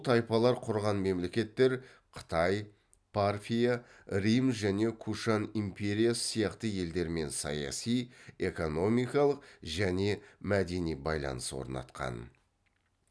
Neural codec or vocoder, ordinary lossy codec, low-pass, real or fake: none; none; none; real